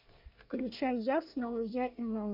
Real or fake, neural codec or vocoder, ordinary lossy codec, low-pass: fake; codec, 24 kHz, 1 kbps, SNAC; MP3, 48 kbps; 5.4 kHz